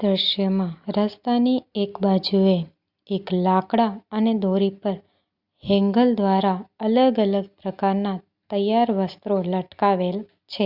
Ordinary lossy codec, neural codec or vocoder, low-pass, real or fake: Opus, 64 kbps; none; 5.4 kHz; real